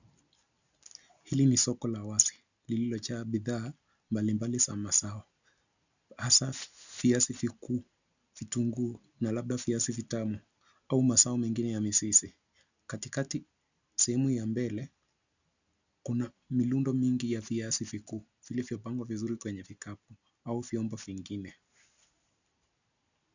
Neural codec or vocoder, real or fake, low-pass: none; real; 7.2 kHz